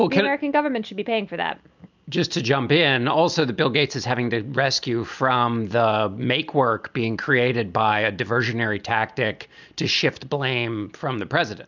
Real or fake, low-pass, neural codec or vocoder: real; 7.2 kHz; none